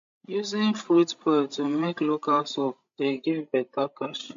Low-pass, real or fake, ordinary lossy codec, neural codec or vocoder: 7.2 kHz; fake; MP3, 48 kbps; codec, 16 kHz, 16 kbps, FreqCodec, larger model